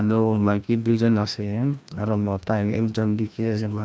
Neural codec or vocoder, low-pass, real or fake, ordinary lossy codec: codec, 16 kHz, 1 kbps, FreqCodec, larger model; none; fake; none